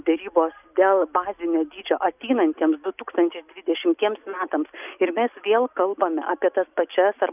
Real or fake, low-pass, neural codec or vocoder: real; 3.6 kHz; none